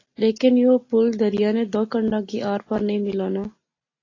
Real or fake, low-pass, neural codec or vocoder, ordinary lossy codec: real; 7.2 kHz; none; AAC, 32 kbps